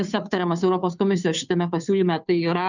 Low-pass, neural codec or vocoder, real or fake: 7.2 kHz; codec, 16 kHz, 2 kbps, FunCodec, trained on Chinese and English, 25 frames a second; fake